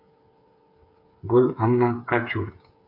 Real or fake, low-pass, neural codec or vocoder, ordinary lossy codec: fake; 5.4 kHz; codec, 16 kHz, 8 kbps, FreqCodec, smaller model; MP3, 48 kbps